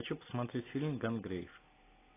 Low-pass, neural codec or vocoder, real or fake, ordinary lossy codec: 3.6 kHz; none; real; AAC, 24 kbps